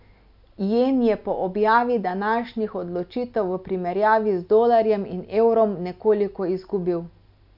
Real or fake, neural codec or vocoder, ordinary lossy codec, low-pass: real; none; none; 5.4 kHz